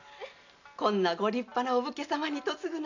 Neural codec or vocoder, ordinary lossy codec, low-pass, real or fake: none; none; 7.2 kHz; real